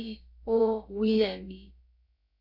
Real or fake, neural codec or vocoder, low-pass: fake; codec, 16 kHz, about 1 kbps, DyCAST, with the encoder's durations; 5.4 kHz